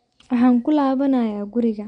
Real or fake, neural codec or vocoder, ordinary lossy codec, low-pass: real; none; MP3, 64 kbps; 9.9 kHz